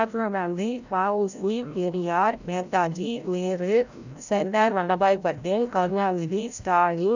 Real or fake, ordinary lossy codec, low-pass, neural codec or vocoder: fake; none; 7.2 kHz; codec, 16 kHz, 0.5 kbps, FreqCodec, larger model